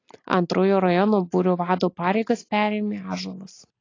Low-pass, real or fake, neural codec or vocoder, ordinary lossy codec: 7.2 kHz; real; none; AAC, 32 kbps